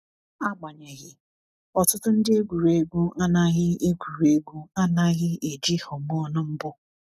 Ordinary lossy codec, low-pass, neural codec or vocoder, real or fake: none; 14.4 kHz; none; real